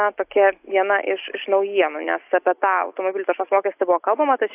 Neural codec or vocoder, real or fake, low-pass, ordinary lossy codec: none; real; 3.6 kHz; AAC, 32 kbps